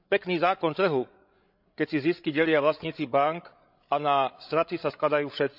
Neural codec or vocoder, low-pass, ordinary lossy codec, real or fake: codec, 16 kHz, 16 kbps, FreqCodec, larger model; 5.4 kHz; none; fake